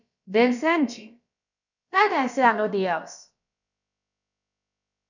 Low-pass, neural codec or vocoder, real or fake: 7.2 kHz; codec, 16 kHz, about 1 kbps, DyCAST, with the encoder's durations; fake